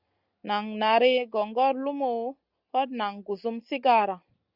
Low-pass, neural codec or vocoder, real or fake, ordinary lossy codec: 5.4 kHz; none; real; Opus, 64 kbps